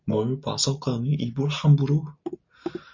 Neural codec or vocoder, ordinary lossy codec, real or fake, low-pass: none; MP3, 48 kbps; real; 7.2 kHz